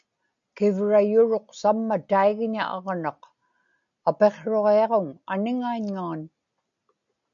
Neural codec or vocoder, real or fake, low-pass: none; real; 7.2 kHz